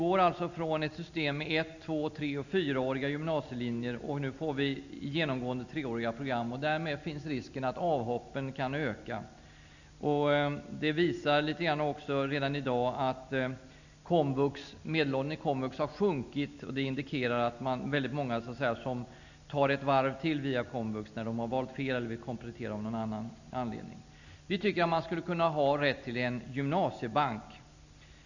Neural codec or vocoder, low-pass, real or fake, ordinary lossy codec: none; 7.2 kHz; real; none